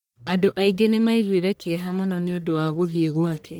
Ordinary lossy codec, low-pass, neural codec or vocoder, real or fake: none; none; codec, 44.1 kHz, 1.7 kbps, Pupu-Codec; fake